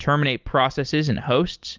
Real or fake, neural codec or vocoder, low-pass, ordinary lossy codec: real; none; 7.2 kHz; Opus, 24 kbps